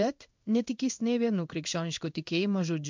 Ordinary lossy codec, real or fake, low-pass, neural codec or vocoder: MP3, 64 kbps; fake; 7.2 kHz; codec, 16 kHz in and 24 kHz out, 1 kbps, XY-Tokenizer